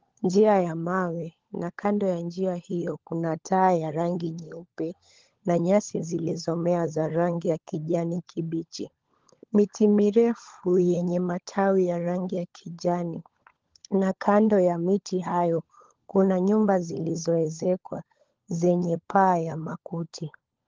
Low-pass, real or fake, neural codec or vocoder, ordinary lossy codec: 7.2 kHz; fake; codec, 16 kHz, 16 kbps, FunCodec, trained on LibriTTS, 50 frames a second; Opus, 16 kbps